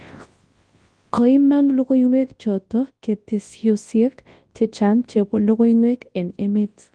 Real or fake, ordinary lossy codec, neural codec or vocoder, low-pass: fake; Opus, 24 kbps; codec, 24 kHz, 0.9 kbps, WavTokenizer, large speech release; 10.8 kHz